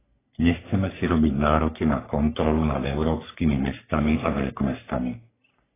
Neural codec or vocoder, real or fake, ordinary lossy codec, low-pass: codec, 44.1 kHz, 3.4 kbps, Pupu-Codec; fake; AAC, 16 kbps; 3.6 kHz